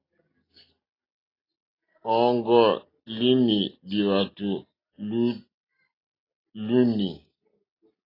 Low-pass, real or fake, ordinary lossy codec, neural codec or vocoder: 5.4 kHz; real; AAC, 24 kbps; none